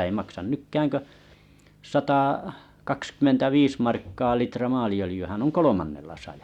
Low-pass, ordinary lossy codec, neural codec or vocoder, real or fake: 19.8 kHz; Opus, 64 kbps; none; real